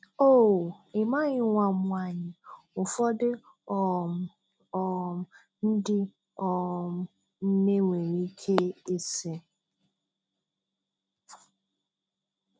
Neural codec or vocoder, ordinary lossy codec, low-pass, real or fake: none; none; none; real